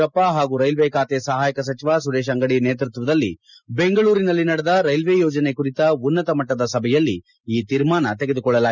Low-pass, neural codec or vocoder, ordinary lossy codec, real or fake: 7.2 kHz; none; none; real